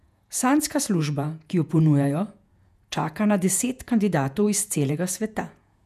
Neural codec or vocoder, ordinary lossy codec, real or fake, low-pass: vocoder, 48 kHz, 128 mel bands, Vocos; none; fake; 14.4 kHz